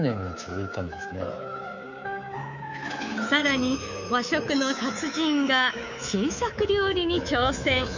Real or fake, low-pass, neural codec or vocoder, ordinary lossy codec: fake; 7.2 kHz; codec, 24 kHz, 3.1 kbps, DualCodec; none